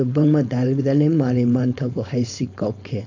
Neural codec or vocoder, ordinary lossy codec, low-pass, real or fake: codec, 16 kHz, 4.8 kbps, FACodec; AAC, 48 kbps; 7.2 kHz; fake